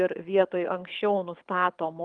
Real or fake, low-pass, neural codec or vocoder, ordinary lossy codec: fake; 9.9 kHz; codec, 24 kHz, 6 kbps, HILCodec; Opus, 32 kbps